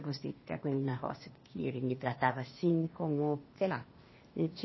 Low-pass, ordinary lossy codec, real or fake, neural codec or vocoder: 7.2 kHz; MP3, 24 kbps; fake; codec, 16 kHz, 0.8 kbps, ZipCodec